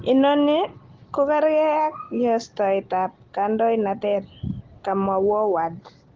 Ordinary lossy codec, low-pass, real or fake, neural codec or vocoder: Opus, 16 kbps; 7.2 kHz; real; none